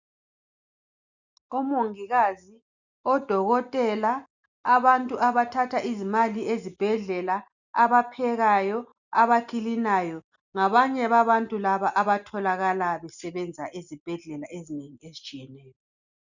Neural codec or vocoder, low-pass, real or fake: none; 7.2 kHz; real